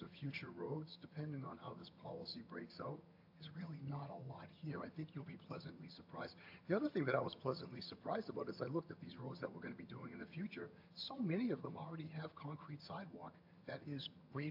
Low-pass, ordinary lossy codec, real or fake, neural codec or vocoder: 5.4 kHz; AAC, 48 kbps; fake; vocoder, 22.05 kHz, 80 mel bands, HiFi-GAN